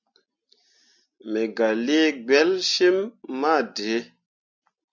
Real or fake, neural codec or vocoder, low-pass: real; none; 7.2 kHz